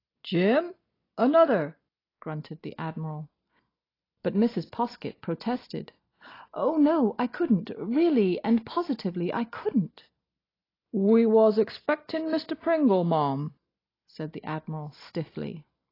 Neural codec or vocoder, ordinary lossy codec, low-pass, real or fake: none; AAC, 24 kbps; 5.4 kHz; real